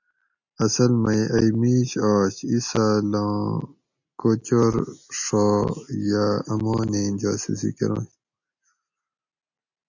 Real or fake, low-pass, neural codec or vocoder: real; 7.2 kHz; none